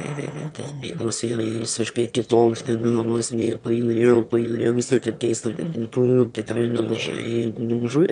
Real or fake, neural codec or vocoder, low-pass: fake; autoencoder, 22.05 kHz, a latent of 192 numbers a frame, VITS, trained on one speaker; 9.9 kHz